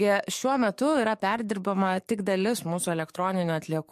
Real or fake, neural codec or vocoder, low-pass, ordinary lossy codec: fake; codec, 44.1 kHz, 7.8 kbps, DAC; 14.4 kHz; MP3, 64 kbps